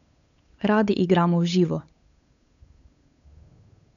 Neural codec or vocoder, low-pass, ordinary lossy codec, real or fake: codec, 16 kHz, 8 kbps, FunCodec, trained on Chinese and English, 25 frames a second; 7.2 kHz; none; fake